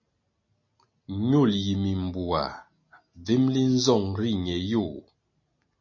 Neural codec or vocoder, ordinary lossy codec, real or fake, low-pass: none; MP3, 32 kbps; real; 7.2 kHz